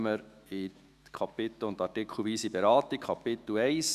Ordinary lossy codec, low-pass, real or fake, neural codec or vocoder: Opus, 64 kbps; 14.4 kHz; fake; autoencoder, 48 kHz, 128 numbers a frame, DAC-VAE, trained on Japanese speech